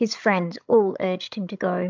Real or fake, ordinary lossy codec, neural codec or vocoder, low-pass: fake; MP3, 64 kbps; codec, 16 kHz, 16 kbps, FreqCodec, larger model; 7.2 kHz